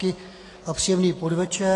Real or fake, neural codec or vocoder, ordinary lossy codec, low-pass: real; none; AAC, 32 kbps; 10.8 kHz